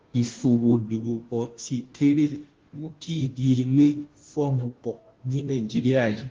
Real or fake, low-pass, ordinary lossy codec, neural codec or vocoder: fake; 7.2 kHz; Opus, 16 kbps; codec, 16 kHz, 0.5 kbps, FunCodec, trained on Chinese and English, 25 frames a second